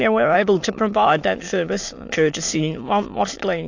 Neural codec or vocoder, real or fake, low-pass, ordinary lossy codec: autoencoder, 22.05 kHz, a latent of 192 numbers a frame, VITS, trained on many speakers; fake; 7.2 kHz; AAC, 48 kbps